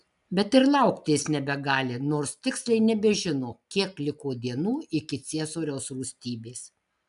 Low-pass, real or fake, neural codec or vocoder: 10.8 kHz; real; none